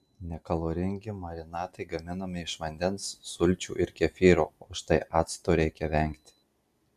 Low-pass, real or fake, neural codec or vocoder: 14.4 kHz; real; none